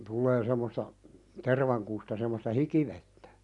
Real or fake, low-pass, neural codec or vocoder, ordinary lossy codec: real; 10.8 kHz; none; none